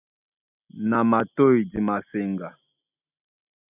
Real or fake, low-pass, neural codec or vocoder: real; 3.6 kHz; none